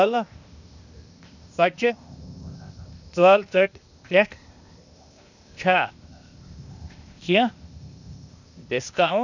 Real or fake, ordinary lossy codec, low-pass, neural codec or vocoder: fake; none; 7.2 kHz; codec, 16 kHz, 0.8 kbps, ZipCodec